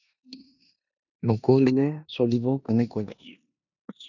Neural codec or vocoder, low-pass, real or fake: codec, 16 kHz in and 24 kHz out, 0.9 kbps, LongCat-Audio-Codec, four codebook decoder; 7.2 kHz; fake